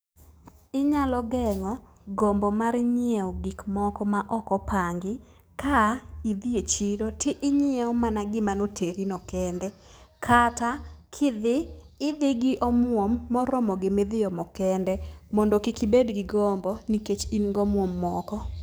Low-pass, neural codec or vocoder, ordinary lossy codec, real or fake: none; codec, 44.1 kHz, 7.8 kbps, DAC; none; fake